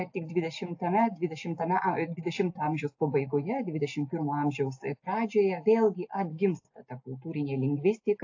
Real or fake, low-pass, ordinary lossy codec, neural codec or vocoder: real; 7.2 kHz; AAC, 48 kbps; none